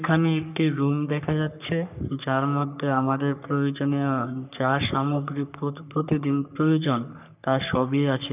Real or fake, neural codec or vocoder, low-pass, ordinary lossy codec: fake; codec, 44.1 kHz, 3.4 kbps, Pupu-Codec; 3.6 kHz; none